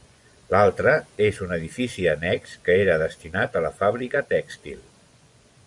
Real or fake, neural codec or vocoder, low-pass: fake; vocoder, 24 kHz, 100 mel bands, Vocos; 10.8 kHz